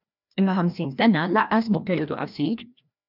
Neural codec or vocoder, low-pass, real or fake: codec, 16 kHz, 1 kbps, FreqCodec, larger model; 5.4 kHz; fake